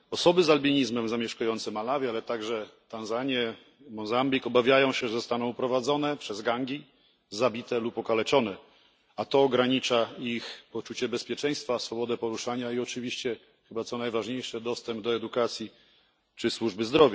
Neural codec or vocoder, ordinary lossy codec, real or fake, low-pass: none; none; real; none